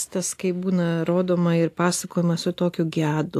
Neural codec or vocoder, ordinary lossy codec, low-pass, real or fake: none; AAC, 64 kbps; 14.4 kHz; real